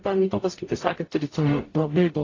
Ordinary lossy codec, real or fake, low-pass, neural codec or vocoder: AAC, 32 kbps; fake; 7.2 kHz; codec, 44.1 kHz, 0.9 kbps, DAC